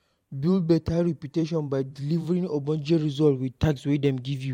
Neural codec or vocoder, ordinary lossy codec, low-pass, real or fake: vocoder, 44.1 kHz, 128 mel bands every 512 samples, BigVGAN v2; MP3, 64 kbps; 19.8 kHz; fake